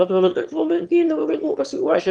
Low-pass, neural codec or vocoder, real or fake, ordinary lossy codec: 9.9 kHz; autoencoder, 22.05 kHz, a latent of 192 numbers a frame, VITS, trained on one speaker; fake; Opus, 24 kbps